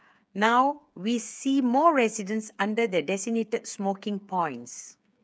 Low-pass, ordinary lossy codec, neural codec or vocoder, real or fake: none; none; codec, 16 kHz, 8 kbps, FreqCodec, smaller model; fake